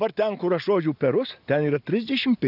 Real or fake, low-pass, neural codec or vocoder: real; 5.4 kHz; none